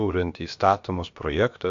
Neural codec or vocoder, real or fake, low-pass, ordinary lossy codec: codec, 16 kHz, about 1 kbps, DyCAST, with the encoder's durations; fake; 7.2 kHz; AAC, 64 kbps